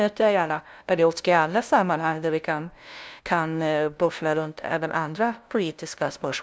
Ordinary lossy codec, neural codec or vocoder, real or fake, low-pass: none; codec, 16 kHz, 0.5 kbps, FunCodec, trained on LibriTTS, 25 frames a second; fake; none